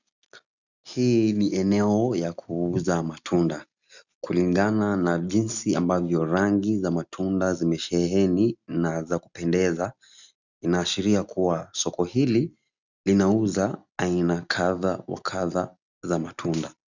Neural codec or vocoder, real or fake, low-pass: none; real; 7.2 kHz